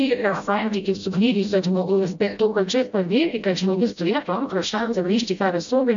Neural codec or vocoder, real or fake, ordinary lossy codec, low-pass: codec, 16 kHz, 0.5 kbps, FreqCodec, smaller model; fake; MP3, 64 kbps; 7.2 kHz